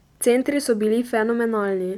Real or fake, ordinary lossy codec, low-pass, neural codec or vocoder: fake; none; 19.8 kHz; vocoder, 44.1 kHz, 128 mel bands every 256 samples, BigVGAN v2